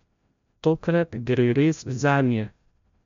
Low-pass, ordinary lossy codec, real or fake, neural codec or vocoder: 7.2 kHz; MP3, 64 kbps; fake; codec, 16 kHz, 0.5 kbps, FreqCodec, larger model